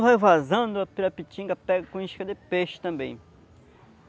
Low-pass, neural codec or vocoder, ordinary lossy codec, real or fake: none; none; none; real